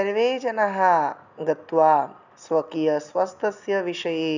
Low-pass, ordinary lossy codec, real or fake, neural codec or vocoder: 7.2 kHz; none; real; none